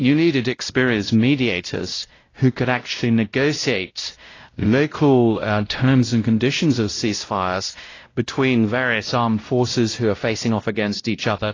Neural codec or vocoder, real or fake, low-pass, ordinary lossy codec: codec, 16 kHz, 0.5 kbps, X-Codec, WavLM features, trained on Multilingual LibriSpeech; fake; 7.2 kHz; AAC, 32 kbps